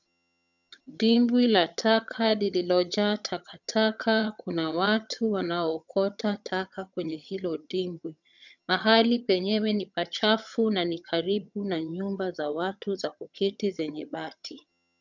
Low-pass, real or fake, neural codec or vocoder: 7.2 kHz; fake; vocoder, 22.05 kHz, 80 mel bands, HiFi-GAN